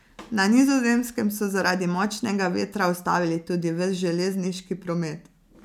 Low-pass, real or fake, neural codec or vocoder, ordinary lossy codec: 19.8 kHz; real; none; none